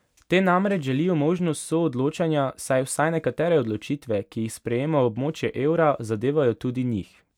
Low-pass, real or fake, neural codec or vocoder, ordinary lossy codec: 19.8 kHz; real; none; none